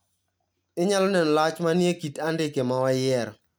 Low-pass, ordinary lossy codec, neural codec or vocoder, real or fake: none; none; none; real